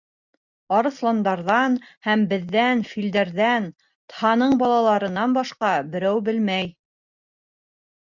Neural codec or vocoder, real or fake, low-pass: none; real; 7.2 kHz